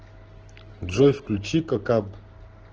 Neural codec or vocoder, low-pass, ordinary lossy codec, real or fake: none; 7.2 kHz; Opus, 16 kbps; real